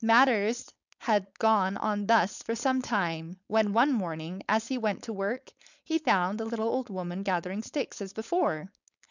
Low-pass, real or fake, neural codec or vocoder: 7.2 kHz; fake; codec, 16 kHz, 4.8 kbps, FACodec